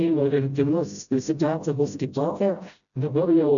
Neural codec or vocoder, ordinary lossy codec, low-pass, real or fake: codec, 16 kHz, 0.5 kbps, FreqCodec, smaller model; MP3, 96 kbps; 7.2 kHz; fake